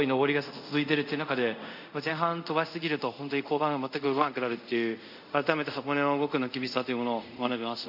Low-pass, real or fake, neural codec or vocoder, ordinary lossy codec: 5.4 kHz; fake; codec, 24 kHz, 0.5 kbps, DualCodec; none